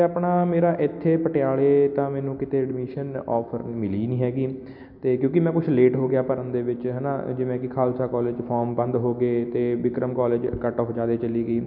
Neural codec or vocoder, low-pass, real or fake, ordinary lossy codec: none; 5.4 kHz; real; none